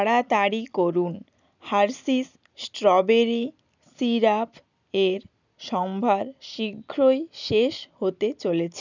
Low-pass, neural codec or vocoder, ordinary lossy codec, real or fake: 7.2 kHz; none; none; real